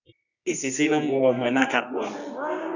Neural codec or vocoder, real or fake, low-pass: codec, 24 kHz, 0.9 kbps, WavTokenizer, medium music audio release; fake; 7.2 kHz